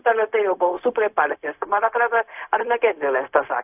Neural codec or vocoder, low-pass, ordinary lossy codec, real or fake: codec, 16 kHz, 0.4 kbps, LongCat-Audio-Codec; 3.6 kHz; AAC, 32 kbps; fake